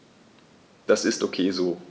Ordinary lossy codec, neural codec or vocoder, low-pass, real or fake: none; none; none; real